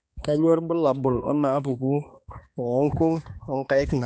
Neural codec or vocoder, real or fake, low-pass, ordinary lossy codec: codec, 16 kHz, 2 kbps, X-Codec, HuBERT features, trained on balanced general audio; fake; none; none